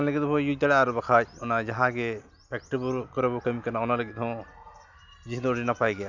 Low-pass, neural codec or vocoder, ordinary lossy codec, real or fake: 7.2 kHz; none; none; real